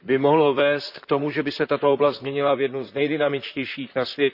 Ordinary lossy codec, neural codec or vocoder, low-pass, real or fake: none; vocoder, 44.1 kHz, 128 mel bands, Pupu-Vocoder; 5.4 kHz; fake